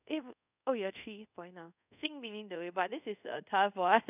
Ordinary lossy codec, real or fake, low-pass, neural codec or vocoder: none; fake; 3.6 kHz; codec, 24 kHz, 0.5 kbps, DualCodec